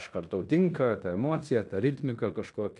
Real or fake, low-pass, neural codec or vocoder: fake; 10.8 kHz; codec, 16 kHz in and 24 kHz out, 0.9 kbps, LongCat-Audio-Codec, fine tuned four codebook decoder